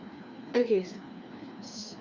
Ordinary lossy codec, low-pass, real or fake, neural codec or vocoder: Opus, 64 kbps; 7.2 kHz; fake; codec, 16 kHz, 2 kbps, FreqCodec, larger model